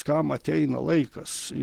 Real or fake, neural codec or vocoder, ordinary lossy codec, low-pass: real; none; Opus, 16 kbps; 19.8 kHz